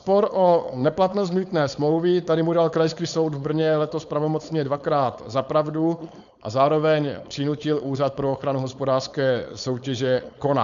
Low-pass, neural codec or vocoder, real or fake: 7.2 kHz; codec, 16 kHz, 4.8 kbps, FACodec; fake